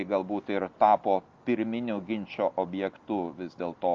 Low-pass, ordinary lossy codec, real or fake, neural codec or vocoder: 7.2 kHz; Opus, 24 kbps; real; none